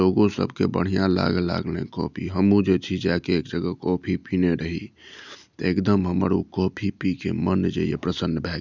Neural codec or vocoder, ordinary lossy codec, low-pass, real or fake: none; none; 7.2 kHz; real